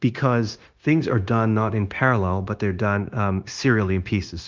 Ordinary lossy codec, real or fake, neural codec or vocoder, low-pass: Opus, 32 kbps; fake; codec, 16 kHz, 0.9 kbps, LongCat-Audio-Codec; 7.2 kHz